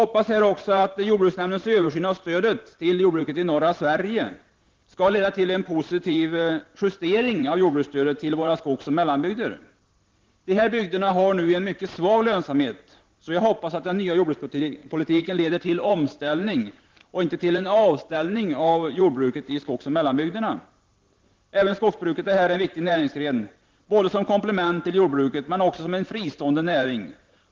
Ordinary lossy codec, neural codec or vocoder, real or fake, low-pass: Opus, 16 kbps; vocoder, 44.1 kHz, 128 mel bands every 512 samples, BigVGAN v2; fake; 7.2 kHz